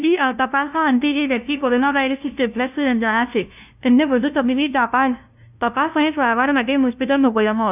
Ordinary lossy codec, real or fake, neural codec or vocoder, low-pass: none; fake; codec, 16 kHz, 0.5 kbps, FunCodec, trained on LibriTTS, 25 frames a second; 3.6 kHz